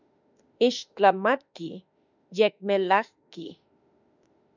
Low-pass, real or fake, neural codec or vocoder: 7.2 kHz; fake; autoencoder, 48 kHz, 32 numbers a frame, DAC-VAE, trained on Japanese speech